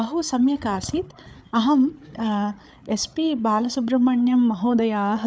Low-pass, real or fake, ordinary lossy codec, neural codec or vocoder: none; fake; none; codec, 16 kHz, 8 kbps, FreqCodec, larger model